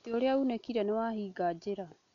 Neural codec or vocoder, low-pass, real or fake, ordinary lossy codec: none; 7.2 kHz; real; none